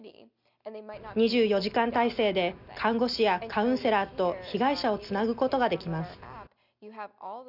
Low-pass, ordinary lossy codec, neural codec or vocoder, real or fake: 5.4 kHz; none; none; real